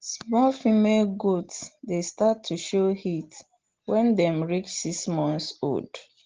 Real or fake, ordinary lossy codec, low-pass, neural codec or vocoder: real; Opus, 16 kbps; 7.2 kHz; none